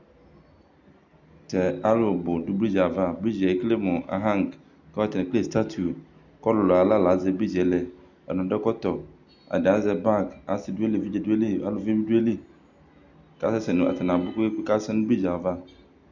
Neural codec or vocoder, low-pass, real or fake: none; 7.2 kHz; real